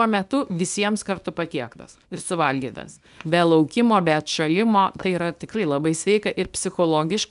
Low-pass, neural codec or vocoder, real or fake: 10.8 kHz; codec, 24 kHz, 0.9 kbps, WavTokenizer, small release; fake